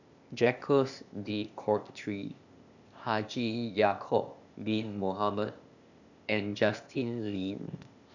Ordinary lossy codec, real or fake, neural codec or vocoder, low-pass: none; fake; codec, 16 kHz, 0.8 kbps, ZipCodec; 7.2 kHz